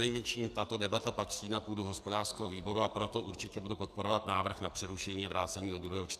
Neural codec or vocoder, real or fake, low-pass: codec, 44.1 kHz, 2.6 kbps, SNAC; fake; 14.4 kHz